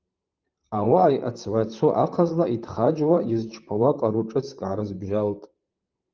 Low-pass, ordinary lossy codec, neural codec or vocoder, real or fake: 7.2 kHz; Opus, 24 kbps; vocoder, 44.1 kHz, 128 mel bands, Pupu-Vocoder; fake